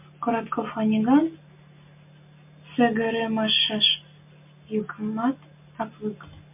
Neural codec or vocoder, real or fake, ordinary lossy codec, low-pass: none; real; MP3, 32 kbps; 3.6 kHz